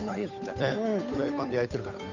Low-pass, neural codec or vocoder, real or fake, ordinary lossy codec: 7.2 kHz; codec, 16 kHz, 8 kbps, FunCodec, trained on Chinese and English, 25 frames a second; fake; none